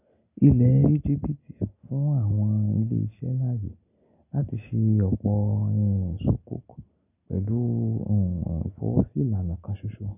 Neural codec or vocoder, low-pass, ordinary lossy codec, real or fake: none; 3.6 kHz; none; real